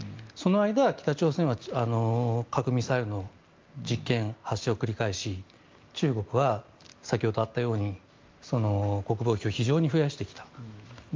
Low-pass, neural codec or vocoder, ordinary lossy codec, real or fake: 7.2 kHz; none; Opus, 24 kbps; real